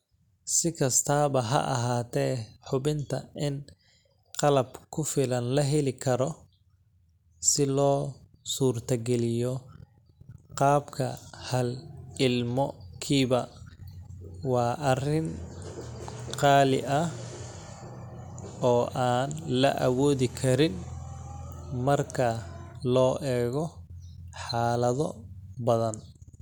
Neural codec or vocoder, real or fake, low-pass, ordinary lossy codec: none; real; 19.8 kHz; none